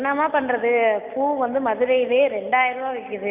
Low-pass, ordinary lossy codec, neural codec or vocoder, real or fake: 3.6 kHz; none; none; real